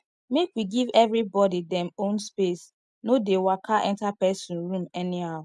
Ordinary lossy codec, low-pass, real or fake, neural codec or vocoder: none; none; real; none